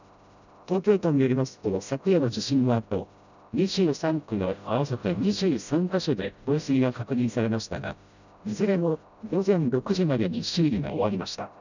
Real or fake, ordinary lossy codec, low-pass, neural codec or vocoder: fake; none; 7.2 kHz; codec, 16 kHz, 0.5 kbps, FreqCodec, smaller model